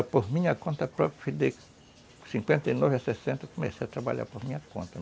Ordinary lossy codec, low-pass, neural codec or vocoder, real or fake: none; none; none; real